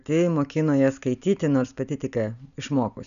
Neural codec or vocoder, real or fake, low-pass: none; real; 7.2 kHz